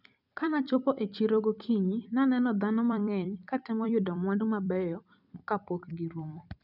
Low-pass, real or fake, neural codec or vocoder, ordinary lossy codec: 5.4 kHz; fake; vocoder, 44.1 kHz, 128 mel bands every 256 samples, BigVGAN v2; none